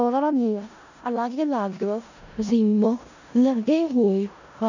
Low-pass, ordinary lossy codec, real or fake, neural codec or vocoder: 7.2 kHz; none; fake; codec, 16 kHz in and 24 kHz out, 0.4 kbps, LongCat-Audio-Codec, four codebook decoder